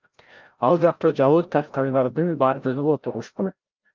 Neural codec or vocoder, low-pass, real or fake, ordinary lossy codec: codec, 16 kHz, 0.5 kbps, FreqCodec, larger model; 7.2 kHz; fake; Opus, 24 kbps